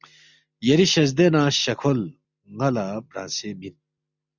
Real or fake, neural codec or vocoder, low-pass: real; none; 7.2 kHz